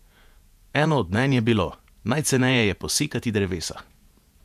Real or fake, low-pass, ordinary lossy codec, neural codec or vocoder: fake; 14.4 kHz; none; vocoder, 48 kHz, 128 mel bands, Vocos